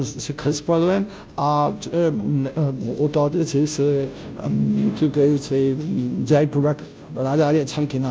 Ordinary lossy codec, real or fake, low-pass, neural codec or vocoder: none; fake; none; codec, 16 kHz, 0.5 kbps, FunCodec, trained on Chinese and English, 25 frames a second